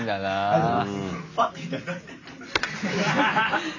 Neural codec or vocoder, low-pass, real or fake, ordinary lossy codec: none; 7.2 kHz; real; none